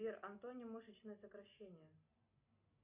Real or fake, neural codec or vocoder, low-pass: real; none; 3.6 kHz